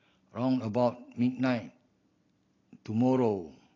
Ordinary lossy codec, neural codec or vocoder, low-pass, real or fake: AAC, 32 kbps; none; 7.2 kHz; real